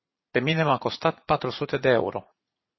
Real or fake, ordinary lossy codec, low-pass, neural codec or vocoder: fake; MP3, 24 kbps; 7.2 kHz; vocoder, 44.1 kHz, 80 mel bands, Vocos